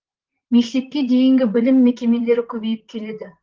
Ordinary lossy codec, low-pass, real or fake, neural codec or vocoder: Opus, 16 kbps; 7.2 kHz; fake; vocoder, 44.1 kHz, 128 mel bands, Pupu-Vocoder